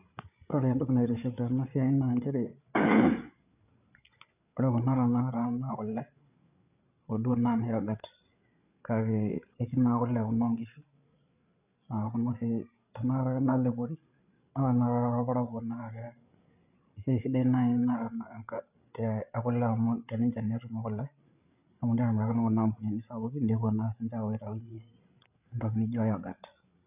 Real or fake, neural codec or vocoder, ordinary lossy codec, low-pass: fake; codec, 16 kHz, 8 kbps, FreqCodec, larger model; none; 3.6 kHz